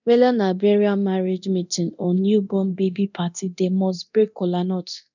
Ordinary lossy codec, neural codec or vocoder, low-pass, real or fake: none; codec, 24 kHz, 0.9 kbps, DualCodec; 7.2 kHz; fake